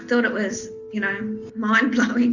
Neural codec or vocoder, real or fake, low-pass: none; real; 7.2 kHz